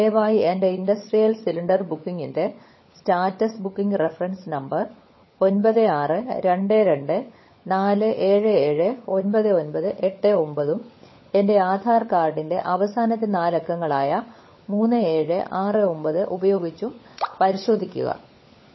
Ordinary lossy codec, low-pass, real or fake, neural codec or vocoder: MP3, 24 kbps; 7.2 kHz; fake; codec, 16 kHz, 16 kbps, FunCodec, trained on LibriTTS, 50 frames a second